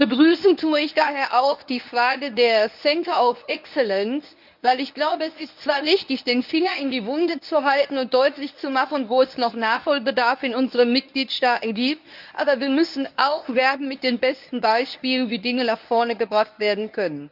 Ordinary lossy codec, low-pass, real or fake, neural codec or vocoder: none; 5.4 kHz; fake; codec, 24 kHz, 0.9 kbps, WavTokenizer, medium speech release version 1